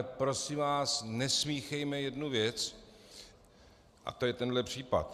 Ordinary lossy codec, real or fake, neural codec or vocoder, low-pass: Opus, 64 kbps; real; none; 14.4 kHz